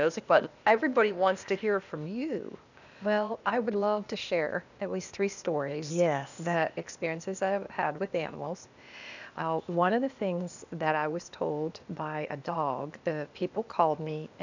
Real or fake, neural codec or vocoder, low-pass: fake; codec, 16 kHz, 0.8 kbps, ZipCodec; 7.2 kHz